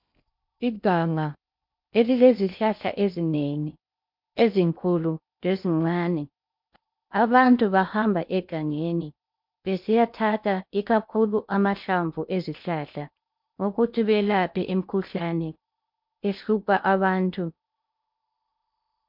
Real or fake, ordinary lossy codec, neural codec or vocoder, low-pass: fake; MP3, 48 kbps; codec, 16 kHz in and 24 kHz out, 0.6 kbps, FocalCodec, streaming, 2048 codes; 5.4 kHz